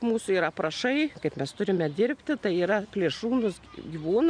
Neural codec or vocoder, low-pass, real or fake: none; 9.9 kHz; real